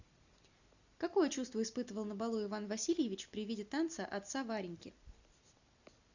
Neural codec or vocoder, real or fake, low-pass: none; real; 7.2 kHz